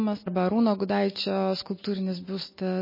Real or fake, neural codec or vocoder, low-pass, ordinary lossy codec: real; none; 5.4 kHz; MP3, 24 kbps